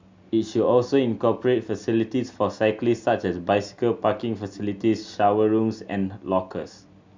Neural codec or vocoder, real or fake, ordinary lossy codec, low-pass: none; real; MP3, 64 kbps; 7.2 kHz